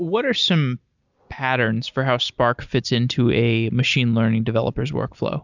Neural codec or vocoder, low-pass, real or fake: none; 7.2 kHz; real